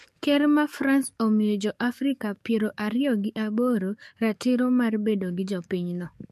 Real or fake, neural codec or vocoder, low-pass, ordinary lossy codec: fake; codec, 44.1 kHz, 7.8 kbps, DAC; 14.4 kHz; MP3, 64 kbps